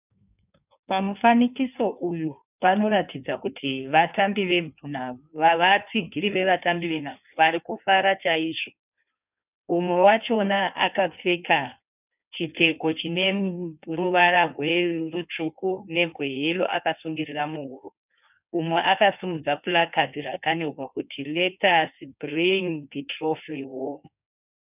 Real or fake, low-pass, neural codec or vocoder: fake; 3.6 kHz; codec, 16 kHz in and 24 kHz out, 1.1 kbps, FireRedTTS-2 codec